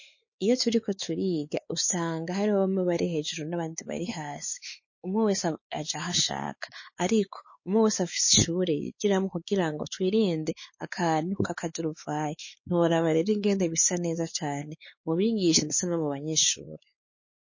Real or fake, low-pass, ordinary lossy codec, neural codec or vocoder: fake; 7.2 kHz; MP3, 32 kbps; codec, 16 kHz, 4 kbps, X-Codec, WavLM features, trained on Multilingual LibriSpeech